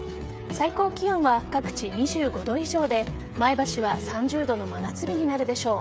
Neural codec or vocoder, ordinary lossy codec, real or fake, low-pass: codec, 16 kHz, 8 kbps, FreqCodec, smaller model; none; fake; none